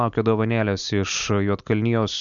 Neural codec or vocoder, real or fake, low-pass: none; real; 7.2 kHz